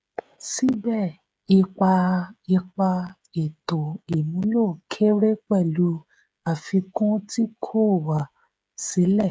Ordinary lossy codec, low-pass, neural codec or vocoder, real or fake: none; none; codec, 16 kHz, 16 kbps, FreqCodec, smaller model; fake